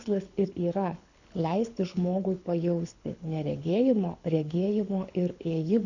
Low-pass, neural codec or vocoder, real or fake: 7.2 kHz; codec, 24 kHz, 6 kbps, HILCodec; fake